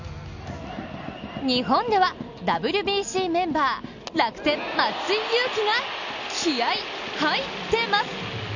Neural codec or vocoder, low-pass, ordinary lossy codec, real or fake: none; 7.2 kHz; none; real